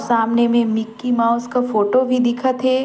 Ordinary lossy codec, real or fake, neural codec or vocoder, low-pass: none; real; none; none